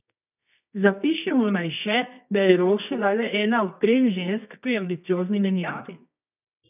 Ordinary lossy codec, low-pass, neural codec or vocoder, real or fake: none; 3.6 kHz; codec, 24 kHz, 0.9 kbps, WavTokenizer, medium music audio release; fake